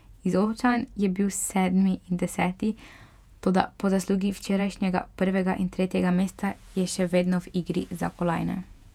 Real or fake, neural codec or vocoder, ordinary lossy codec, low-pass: fake; vocoder, 48 kHz, 128 mel bands, Vocos; none; 19.8 kHz